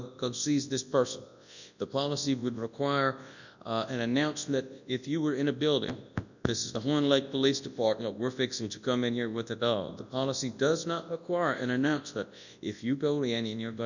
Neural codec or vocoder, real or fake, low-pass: codec, 24 kHz, 0.9 kbps, WavTokenizer, large speech release; fake; 7.2 kHz